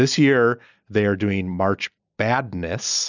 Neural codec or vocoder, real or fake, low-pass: none; real; 7.2 kHz